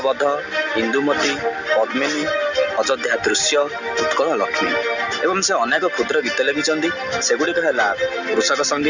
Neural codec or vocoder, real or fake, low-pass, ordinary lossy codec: none; real; 7.2 kHz; none